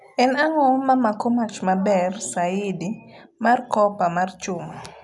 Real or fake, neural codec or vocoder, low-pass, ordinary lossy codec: real; none; 10.8 kHz; none